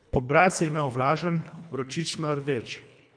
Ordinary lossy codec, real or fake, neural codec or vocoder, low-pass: none; fake; codec, 24 kHz, 1.5 kbps, HILCodec; 9.9 kHz